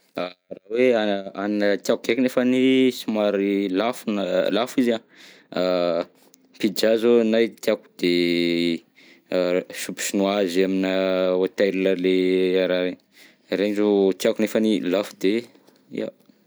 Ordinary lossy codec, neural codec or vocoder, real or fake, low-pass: none; none; real; none